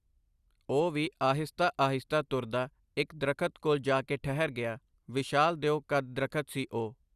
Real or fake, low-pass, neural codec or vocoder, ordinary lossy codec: real; 14.4 kHz; none; Opus, 64 kbps